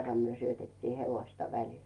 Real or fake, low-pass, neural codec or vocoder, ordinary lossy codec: real; 10.8 kHz; none; Opus, 32 kbps